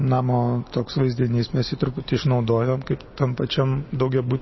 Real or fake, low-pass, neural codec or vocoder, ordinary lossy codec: real; 7.2 kHz; none; MP3, 24 kbps